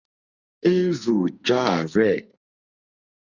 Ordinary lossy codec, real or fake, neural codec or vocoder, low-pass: Opus, 64 kbps; fake; codec, 44.1 kHz, 2.6 kbps, SNAC; 7.2 kHz